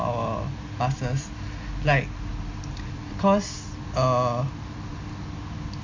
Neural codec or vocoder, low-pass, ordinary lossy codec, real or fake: none; 7.2 kHz; MP3, 48 kbps; real